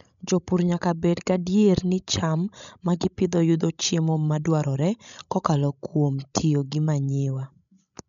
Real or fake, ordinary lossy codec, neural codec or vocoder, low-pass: fake; none; codec, 16 kHz, 16 kbps, FreqCodec, larger model; 7.2 kHz